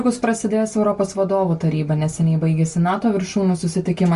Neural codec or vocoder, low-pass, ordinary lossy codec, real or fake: none; 10.8 kHz; Opus, 64 kbps; real